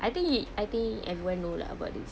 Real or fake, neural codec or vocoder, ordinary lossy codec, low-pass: real; none; none; none